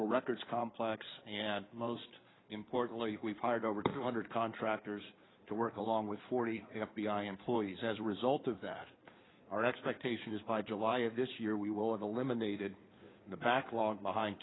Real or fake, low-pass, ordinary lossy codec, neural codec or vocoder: fake; 7.2 kHz; AAC, 16 kbps; codec, 16 kHz in and 24 kHz out, 2.2 kbps, FireRedTTS-2 codec